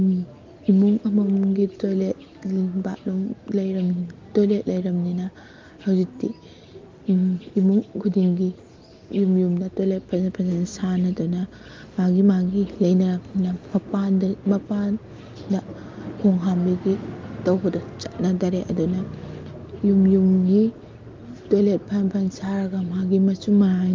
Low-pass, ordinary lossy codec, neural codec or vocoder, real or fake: 7.2 kHz; Opus, 24 kbps; none; real